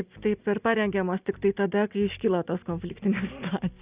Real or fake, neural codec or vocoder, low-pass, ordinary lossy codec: real; none; 3.6 kHz; Opus, 64 kbps